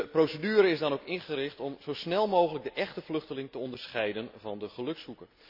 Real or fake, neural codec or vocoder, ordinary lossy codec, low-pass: real; none; none; 5.4 kHz